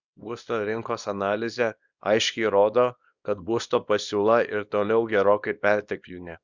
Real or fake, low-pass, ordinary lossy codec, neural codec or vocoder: fake; 7.2 kHz; Opus, 64 kbps; codec, 24 kHz, 0.9 kbps, WavTokenizer, small release